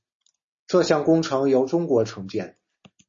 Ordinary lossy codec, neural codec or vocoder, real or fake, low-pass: MP3, 32 kbps; none; real; 7.2 kHz